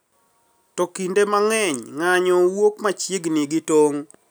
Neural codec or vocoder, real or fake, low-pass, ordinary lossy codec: none; real; none; none